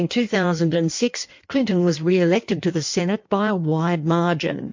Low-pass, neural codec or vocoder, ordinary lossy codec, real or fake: 7.2 kHz; codec, 16 kHz in and 24 kHz out, 1.1 kbps, FireRedTTS-2 codec; MP3, 48 kbps; fake